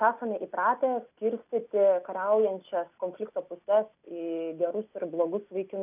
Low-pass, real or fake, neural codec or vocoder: 3.6 kHz; real; none